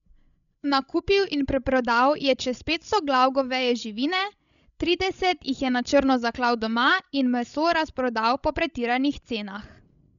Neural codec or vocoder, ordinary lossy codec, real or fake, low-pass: codec, 16 kHz, 16 kbps, FreqCodec, larger model; none; fake; 7.2 kHz